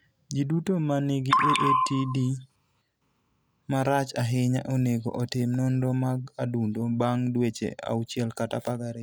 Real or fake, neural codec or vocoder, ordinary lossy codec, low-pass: real; none; none; none